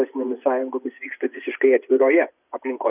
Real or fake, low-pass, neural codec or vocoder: fake; 3.6 kHz; vocoder, 24 kHz, 100 mel bands, Vocos